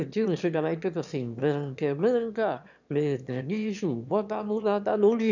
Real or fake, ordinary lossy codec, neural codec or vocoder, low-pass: fake; none; autoencoder, 22.05 kHz, a latent of 192 numbers a frame, VITS, trained on one speaker; 7.2 kHz